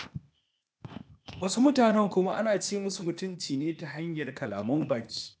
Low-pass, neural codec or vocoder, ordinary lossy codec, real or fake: none; codec, 16 kHz, 0.8 kbps, ZipCodec; none; fake